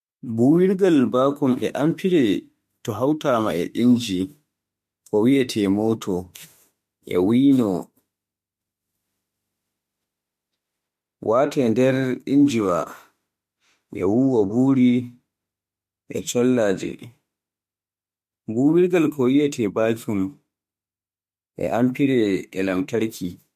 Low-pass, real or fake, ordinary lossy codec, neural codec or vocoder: 14.4 kHz; fake; MP3, 64 kbps; autoencoder, 48 kHz, 32 numbers a frame, DAC-VAE, trained on Japanese speech